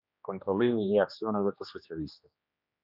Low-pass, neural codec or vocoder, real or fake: 5.4 kHz; codec, 16 kHz, 2 kbps, X-Codec, HuBERT features, trained on general audio; fake